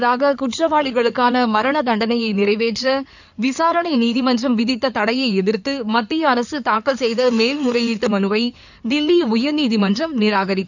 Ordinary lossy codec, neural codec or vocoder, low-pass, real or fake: none; codec, 16 kHz in and 24 kHz out, 2.2 kbps, FireRedTTS-2 codec; 7.2 kHz; fake